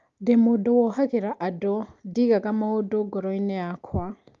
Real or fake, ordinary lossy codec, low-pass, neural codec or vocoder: real; Opus, 32 kbps; 7.2 kHz; none